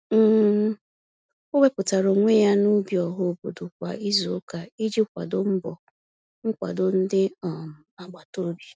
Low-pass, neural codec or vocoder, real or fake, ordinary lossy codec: none; none; real; none